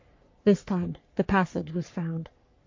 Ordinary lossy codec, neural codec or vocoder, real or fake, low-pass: MP3, 48 kbps; codec, 44.1 kHz, 3.4 kbps, Pupu-Codec; fake; 7.2 kHz